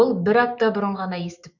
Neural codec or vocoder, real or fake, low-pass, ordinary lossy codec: autoencoder, 48 kHz, 128 numbers a frame, DAC-VAE, trained on Japanese speech; fake; 7.2 kHz; none